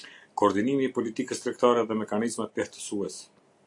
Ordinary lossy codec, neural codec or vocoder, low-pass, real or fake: AAC, 64 kbps; none; 10.8 kHz; real